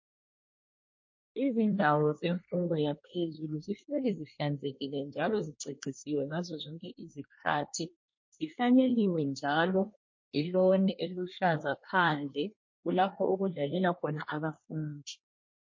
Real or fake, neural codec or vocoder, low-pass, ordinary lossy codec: fake; codec, 24 kHz, 1 kbps, SNAC; 7.2 kHz; MP3, 32 kbps